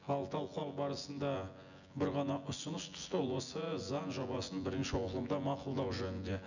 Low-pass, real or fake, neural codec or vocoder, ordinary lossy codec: 7.2 kHz; fake; vocoder, 24 kHz, 100 mel bands, Vocos; none